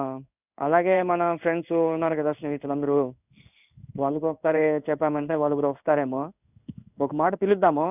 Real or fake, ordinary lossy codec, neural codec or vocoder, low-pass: fake; none; codec, 16 kHz in and 24 kHz out, 1 kbps, XY-Tokenizer; 3.6 kHz